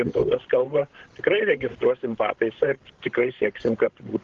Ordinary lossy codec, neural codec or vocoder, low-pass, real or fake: Opus, 16 kbps; codec, 16 kHz, 16 kbps, FreqCodec, larger model; 7.2 kHz; fake